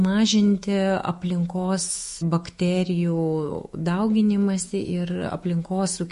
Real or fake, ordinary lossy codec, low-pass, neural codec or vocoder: fake; MP3, 48 kbps; 14.4 kHz; autoencoder, 48 kHz, 128 numbers a frame, DAC-VAE, trained on Japanese speech